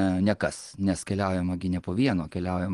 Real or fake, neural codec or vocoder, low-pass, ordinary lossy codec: fake; vocoder, 24 kHz, 100 mel bands, Vocos; 10.8 kHz; Opus, 24 kbps